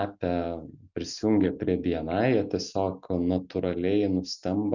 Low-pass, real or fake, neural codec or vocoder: 7.2 kHz; real; none